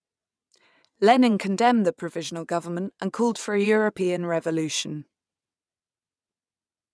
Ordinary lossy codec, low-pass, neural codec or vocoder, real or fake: none; none; vocoder, 22.05 kHz, 80 mel bands, WaveNeXt; fake